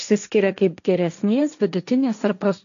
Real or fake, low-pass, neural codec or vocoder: fake; 7.2 kHz; codec, 16 kHz, 1.1 kbps, Voila-Tokenizer